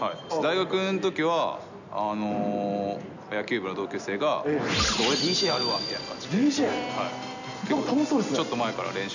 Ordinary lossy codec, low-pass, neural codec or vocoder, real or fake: none; 7.2 kHz; none; real